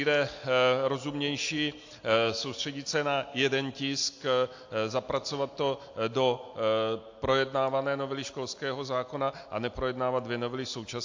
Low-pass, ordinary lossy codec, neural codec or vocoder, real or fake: 7.2 kHz; AAC, 48 kbps; none; real